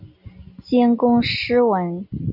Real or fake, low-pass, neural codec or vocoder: real; 5.4 kHz; none